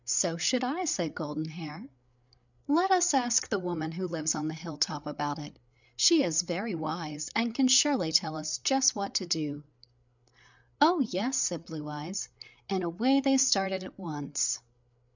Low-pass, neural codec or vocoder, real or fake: 7.2 kHz; codec, 16 kHz, 8 kbps, FreqCodec, larger model; fake